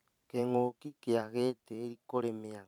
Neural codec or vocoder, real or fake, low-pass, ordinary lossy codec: vocoder, 44.1 kHz, 128 mel bands every 512 samples, BigVGAN v2; fake; 19.8 kHz; none